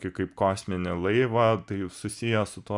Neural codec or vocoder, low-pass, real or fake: none; 10.8 kHz; real